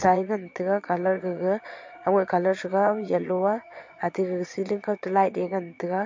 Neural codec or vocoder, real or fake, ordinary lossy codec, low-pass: vocoder, 22.05 kHz, 80 mel bands, Vocos; fake; MP3, 48 kbps; 7.2 kHz